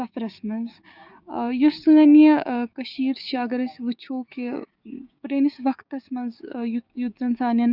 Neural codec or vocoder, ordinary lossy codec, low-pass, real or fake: codec, 16 kHz, 6 kbps, DAC; Opus, 64 kbps; 5.4 kHz; fake